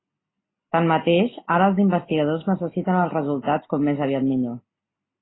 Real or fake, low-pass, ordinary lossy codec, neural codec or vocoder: real; 7.2 kHz; AAC, 16 kbps; none